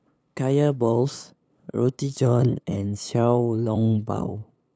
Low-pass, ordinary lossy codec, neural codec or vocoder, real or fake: none; none; codec, 16 kHz, 8 kbps, FunCodec, trained on LibriTTS, 25 frames a second; fake